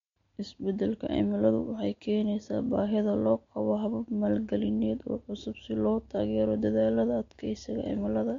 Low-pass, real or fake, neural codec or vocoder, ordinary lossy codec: 7.2 kHz; real; none; MP3, 48 kbps